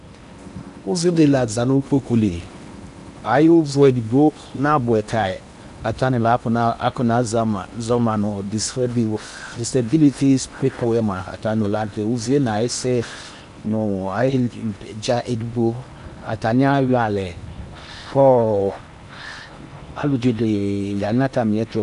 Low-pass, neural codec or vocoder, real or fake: 10.8 kHz; codec, 16 kHz in and 24 kHz out, 0.8 kbps, FocalCodec, streaming, 65536 codes; fake